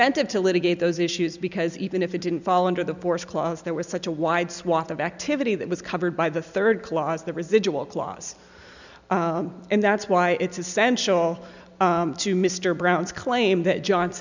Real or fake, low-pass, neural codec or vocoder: real; 7.2 kHz; none